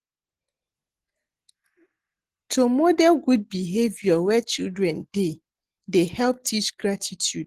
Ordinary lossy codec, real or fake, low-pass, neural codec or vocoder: Opus, 16 kbps; real; 14.4 kHz; none